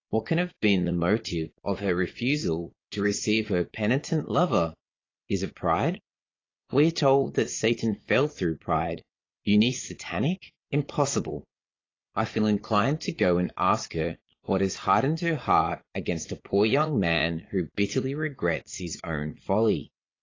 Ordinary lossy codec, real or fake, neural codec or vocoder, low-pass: AAC, 32 kbps; fake; vocoder, 22.05 kHz, 80 mel bands, Vocos; 7.2 kHz